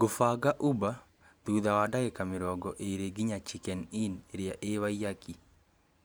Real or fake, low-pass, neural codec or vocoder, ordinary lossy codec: fake; none; vocoder, 44.1 kHz, 128 mel bands every 512 samples, BigVGAN v2; none